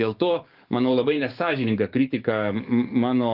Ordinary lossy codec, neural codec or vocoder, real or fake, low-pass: Opus, 24 kbps; codec, 44.1 kHz, 7.8 kbps, DAC; fake; 5.4 kHz